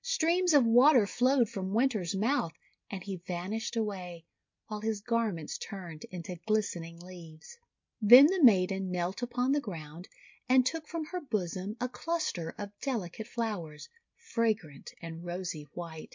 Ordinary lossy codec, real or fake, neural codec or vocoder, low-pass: MP3, 48 kbps; real; none; 7.2 kHz